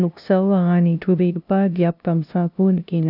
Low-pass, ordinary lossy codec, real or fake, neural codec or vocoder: 5.4 kHz; none; fake; codec, 16 kHz, 0.5 kbps, FunCodec, trained on LibriTTS, 25 frames a second